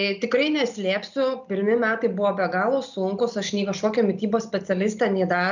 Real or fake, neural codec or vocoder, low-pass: real; none; 7.2 kHz